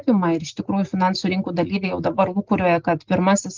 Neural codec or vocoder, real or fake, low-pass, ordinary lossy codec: none; real; 7.2 kHz; Opus, 16 kbps